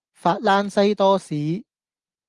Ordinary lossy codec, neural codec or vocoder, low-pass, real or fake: Opus, 24 kbps; none; 10.8 kHz; real